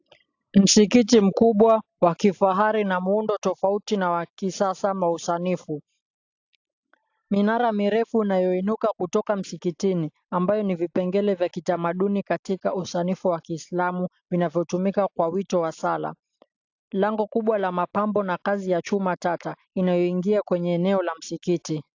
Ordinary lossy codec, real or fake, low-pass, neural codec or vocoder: AAC, 48 kbps; real; 7.2 kHz; none